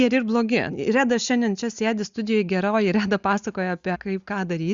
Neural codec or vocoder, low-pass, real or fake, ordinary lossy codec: none; 7.2 kHz; real; Opus, 64 kbps